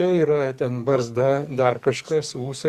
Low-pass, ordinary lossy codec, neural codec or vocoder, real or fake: 14.4 kHz; Opus, 64 kbps; codec, 44.1 kHz, 2.6 kbps, SNAC; fake